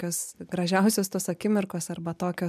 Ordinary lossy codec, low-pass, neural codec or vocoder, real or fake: MP3, 96 kbps; 14.4 kHz; none; real